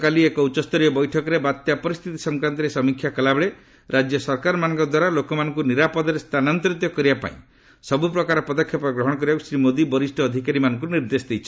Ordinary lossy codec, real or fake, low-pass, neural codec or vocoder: none; real; none; none